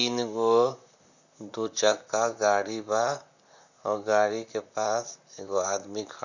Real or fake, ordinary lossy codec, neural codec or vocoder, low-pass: fake; none; vocoder, 44.1 kHz, 128 mel bands, Pupu-Vocoder; 7.2 kHz